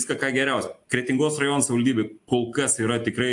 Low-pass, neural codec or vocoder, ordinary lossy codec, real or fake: 10.8 kHz; none; AAC, 64 kbps; real